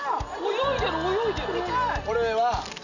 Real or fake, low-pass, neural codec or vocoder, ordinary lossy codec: real; 7.2 kHz; none; none